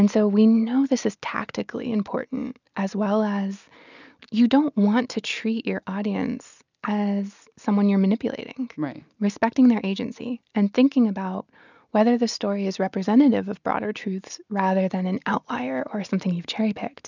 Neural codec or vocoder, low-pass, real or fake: none; 7.2 kHz; real